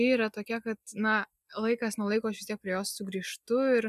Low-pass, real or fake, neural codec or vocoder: 14.4 kHz; real; none